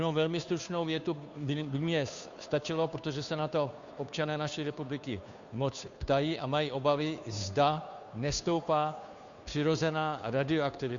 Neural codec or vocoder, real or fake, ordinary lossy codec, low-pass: codec, 16 kHz, 2 kbps, FunCodec, trained on Chinese and English, 25 frames a second; fake; Opus, 64 kbps; 7.2 kHz